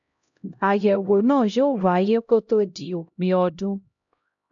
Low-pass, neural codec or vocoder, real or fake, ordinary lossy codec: 7.2 kHz; codec, 16 kHz, 0.5 kbps, X-Codec, HuBERT features, trained on LibriSpeech; fake; none